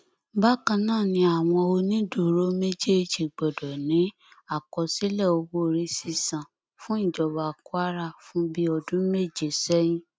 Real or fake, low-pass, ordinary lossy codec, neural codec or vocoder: real; none; none; none